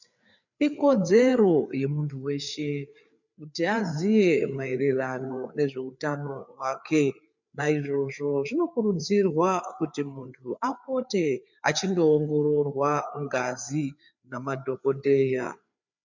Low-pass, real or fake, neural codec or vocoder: 7.2 kHz; fake; codec, 16 kHz, 4 kbps, FreqCodec, larger model